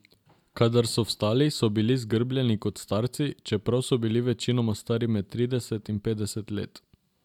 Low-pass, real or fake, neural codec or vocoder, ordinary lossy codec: 19.8 kHz; real; none; none